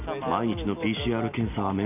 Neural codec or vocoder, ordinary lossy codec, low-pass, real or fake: none; none; 3.6 kHz; real